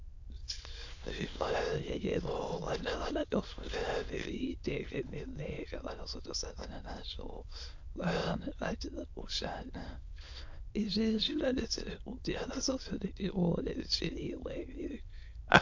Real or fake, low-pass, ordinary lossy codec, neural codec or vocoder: fake; 7.2 kHz; AAC, 48 kbps; autoencoder, 22.05 kHz, a latent of 192 numbers a frame, VITS, trained on many speakers